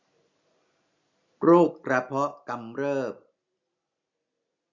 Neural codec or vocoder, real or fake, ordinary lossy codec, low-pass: none; real; Opus, 64 kbps; 7.2 kHz